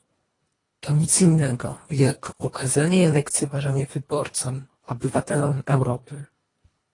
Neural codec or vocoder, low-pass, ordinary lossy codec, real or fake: codec, 24 kHz, 1.5 kbps, HILCodec; 10.8 kHz; AAC, 32 kbps; fake